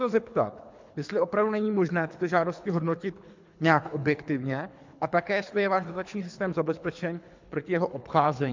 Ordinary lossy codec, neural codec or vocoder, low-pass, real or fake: MP3, 64 kbps; codec, 24 kHz, 3 kbps, HILCodec; 7.2 kHz; fake